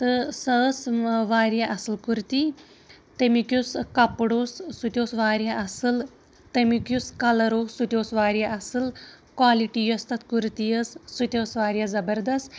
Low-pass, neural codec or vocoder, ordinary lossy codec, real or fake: none; none; none; real